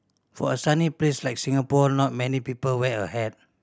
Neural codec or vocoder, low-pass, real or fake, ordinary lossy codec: none; none; real; none